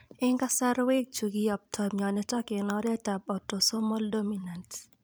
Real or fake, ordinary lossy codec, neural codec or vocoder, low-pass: fake; none; vocoder, 44.1 kHz, 128 mel bands every 256 samples, BigVGAN v2; none